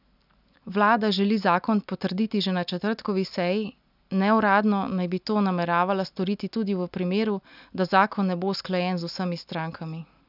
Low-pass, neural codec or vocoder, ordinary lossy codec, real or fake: 5.4 kHz; none; none; real